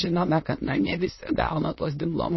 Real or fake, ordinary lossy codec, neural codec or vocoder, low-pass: fake; MP3, 24 kbps; autoencoder, 22.05 kHz, a latent of 192 numbers a frame, VITS, trained on many speakers; 7.2 kHz